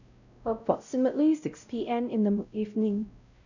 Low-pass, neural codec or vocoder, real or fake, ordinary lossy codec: 7.2 kHz; codec, 16 kHz, 0.5 kbps, X-Codec, WavLM features, trained on Multilingual LibriSpeech; fake; none